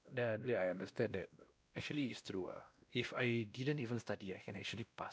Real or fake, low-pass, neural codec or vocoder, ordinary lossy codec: fake; none; codec, 16 kHz, 1 kbps, X-Codec, WavLM features, trained on Multilingual LibriSpeech; none